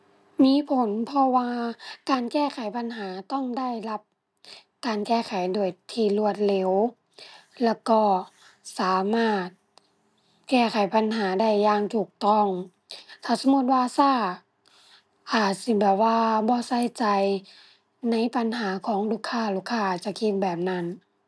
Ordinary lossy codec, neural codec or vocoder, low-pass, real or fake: none; none; none; real